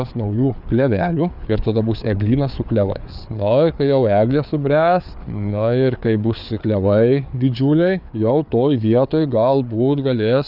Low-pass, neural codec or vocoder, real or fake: 5.4 kHz; codec, 16 kHz, 4 kbps, FreqCodec, larger model; fake